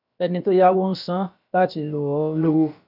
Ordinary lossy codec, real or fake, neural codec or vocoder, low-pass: none; fake; codec, 16 kHz, about 1 kbps, DyCAST, with the encoder's durations; 5.4 kHz